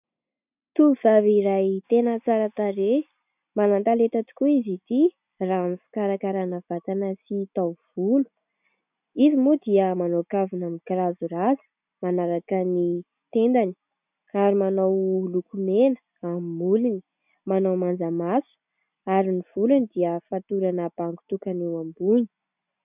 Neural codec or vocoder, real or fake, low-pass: none; real; 3.6 kHz